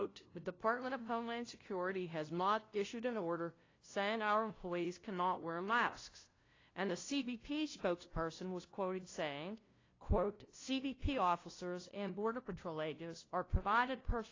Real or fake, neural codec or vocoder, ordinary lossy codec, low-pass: fake; codec, 16 kHz, 0.5 kbps, FunCodec, trained on LibriTTS, 25 frames a second; AAC, 32 kbps; 7.2 kHz